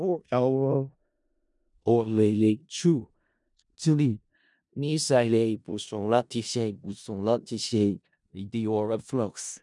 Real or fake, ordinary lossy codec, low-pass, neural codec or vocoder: fake; none; 10.8 kHz; codec, 16 kHz in and 24 kHz out, 0.4 kbps, LongCat-Audio-Codec, four codebook decoder